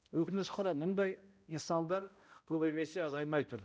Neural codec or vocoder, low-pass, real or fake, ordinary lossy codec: codec, 16 kHz, 0.5 kbps, X-Codec, HuBERT features, trained on balanced general audio; none; fake; none